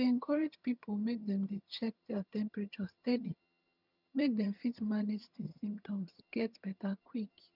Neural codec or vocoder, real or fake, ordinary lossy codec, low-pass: vocoder, 22.05 kHz, 80 mel bands, HiFi-GAN; fake; none; 5.4 kHz